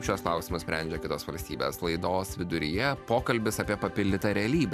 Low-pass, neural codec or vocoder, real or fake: 14.4 kHz; none; real